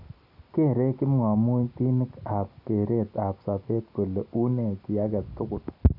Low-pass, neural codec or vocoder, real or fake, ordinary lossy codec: 5.4 kHz; none; real; none